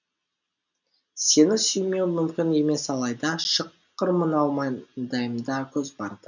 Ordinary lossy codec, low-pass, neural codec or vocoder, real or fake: none; 7.2 kHz; none; real